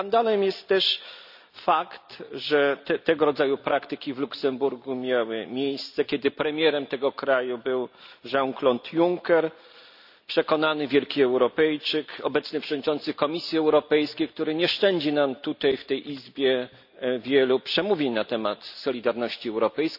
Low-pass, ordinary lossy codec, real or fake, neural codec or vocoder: 5.4 kHz; none; real; none